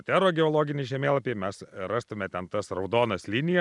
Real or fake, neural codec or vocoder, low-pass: fake; vocoder, 44.1 kHz, 128 mel bands every 256 samples, BigVGAN v2; 10.8 kHz